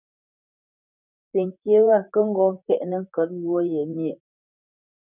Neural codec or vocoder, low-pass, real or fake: vocoder, 44.1 kHz, 128 mel bands, Pupu-Vocoder; 3.6 kHz; fake